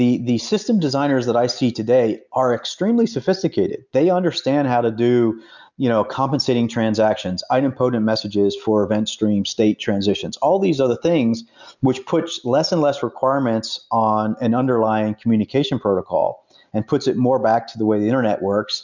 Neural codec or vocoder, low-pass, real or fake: none; 7.2 kHz; real